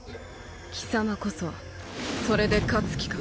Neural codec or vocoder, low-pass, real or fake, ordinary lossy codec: none; none; real; none